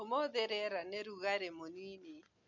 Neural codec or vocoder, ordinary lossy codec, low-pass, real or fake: none; none; 7.2 kHz; real